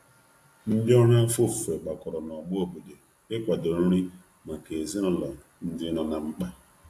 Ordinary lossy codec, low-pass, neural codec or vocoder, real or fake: AAC, 64 kbps; 14.4 kHz; none; real